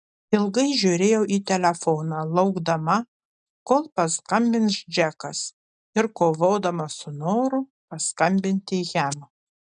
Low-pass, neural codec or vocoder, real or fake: 9.9 kHz; none; real